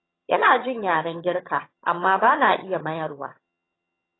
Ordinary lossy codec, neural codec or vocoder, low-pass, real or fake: AAC, 16 kbps; vocoder, 22.05 kHz, 80 mel bands, HiFi-GAN; 7.2 kHz; fake